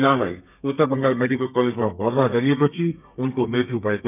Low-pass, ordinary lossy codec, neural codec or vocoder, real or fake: 3.6 kHz; none; codec, 32 kHz, 1.9 kbps, SNAC; fake